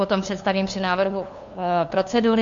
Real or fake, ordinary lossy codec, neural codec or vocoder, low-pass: fake; AAC, 64 kbps; codec, 16 kHz, 2 kbps, FunCodec, trained on LibriTTS, 25 frames a second; 7.2 kHz